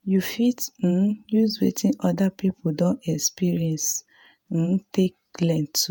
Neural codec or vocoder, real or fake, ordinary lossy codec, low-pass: vocoder, 48 kHz, 128 mel bands, Vocos; fake; none; none